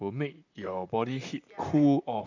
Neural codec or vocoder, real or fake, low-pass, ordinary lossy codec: vocoder, 44.1 kHz, 128 mel bands, Pupu-Vocoder; fake; 7.2 kHz; none